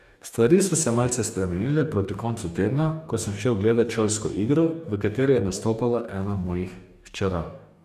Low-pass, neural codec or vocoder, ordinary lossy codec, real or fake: 14.4 kHz; codec, 44.1 kHz, 2.6 kbps, DAC; none; fake